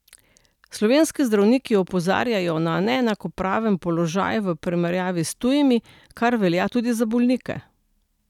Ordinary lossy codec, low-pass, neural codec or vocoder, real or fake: none; 19.8 kHz; none; real